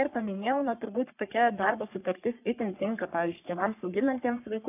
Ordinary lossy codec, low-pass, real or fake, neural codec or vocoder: AAC, 32 kbps; 3.6 kHz; fake; codec, 44.1 kHz, 3.4 kbps, Pupu-Codec